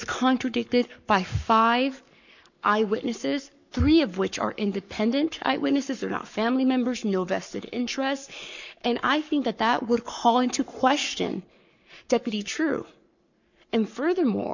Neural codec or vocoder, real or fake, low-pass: codec, 44.1 kHz, 7.8 kbps, Pupu-Codec; fake; 7.2 kHz